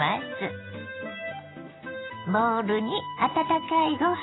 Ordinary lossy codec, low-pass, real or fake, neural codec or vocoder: AAC, 16 kbps; 7.2 kHz; real; none